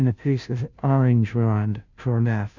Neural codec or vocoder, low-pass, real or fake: codec, 16 kHz, 0.5 kbps, FunCodec, trained on Chinese and English, 25 frames a second; 7.2 kHz; fake